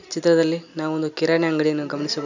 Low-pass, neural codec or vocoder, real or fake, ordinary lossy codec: 7.2 kHz; none; real; none